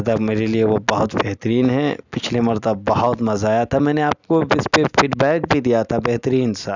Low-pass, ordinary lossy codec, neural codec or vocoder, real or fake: 7.2 kHz; none; none; real